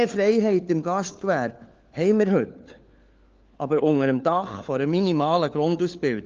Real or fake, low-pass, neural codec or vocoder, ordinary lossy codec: fake; 7.2 kHz; codec, 16 kHz, 4 kbps, FunCodec, trained on LibriTTS, 50 frames a second; Opus, 24 kbps